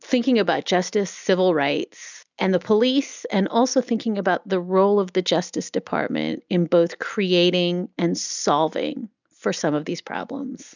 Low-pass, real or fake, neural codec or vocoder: 7.2 kHz; real; none